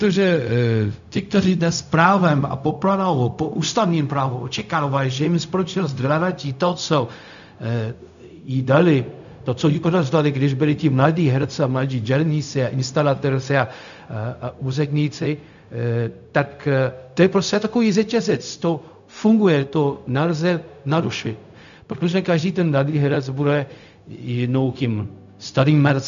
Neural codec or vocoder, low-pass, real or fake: codec, 16 kHz, 0.4 kbps, LongCat-Audio-Codec; 7.2 kHz; fake